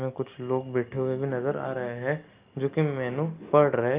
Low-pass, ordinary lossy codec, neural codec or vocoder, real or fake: 3.6 kHz; Opus, 24 kbps; none; real